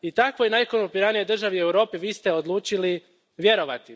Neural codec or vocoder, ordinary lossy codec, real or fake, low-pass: none; none; real; none